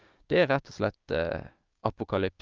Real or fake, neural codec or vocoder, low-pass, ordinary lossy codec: fake; codec, 16 kHz, 6 kbps, DAC; 7.2 kHz; Opus, 32 kbps